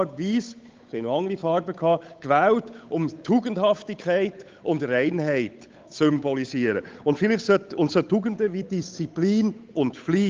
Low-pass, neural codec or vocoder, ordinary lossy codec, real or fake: 7.2 kHz; codec, 16 kHz, 8 kbps, FunCodec, trained on Chinese and English, 25 frames a second; Opus, 24 kbps; fake